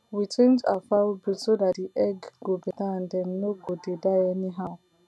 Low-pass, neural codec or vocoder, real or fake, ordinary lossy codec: none; none; real; none